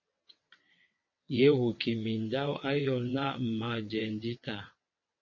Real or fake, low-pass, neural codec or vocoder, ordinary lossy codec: fake; 7.2 kHz; vocoder, 22.05 kHz, 80 mel bands, WaveNeXt; MP3, 32 kbps